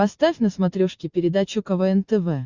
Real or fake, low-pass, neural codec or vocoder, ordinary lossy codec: real; 7.2 kHz; none; Opus, 64 kbps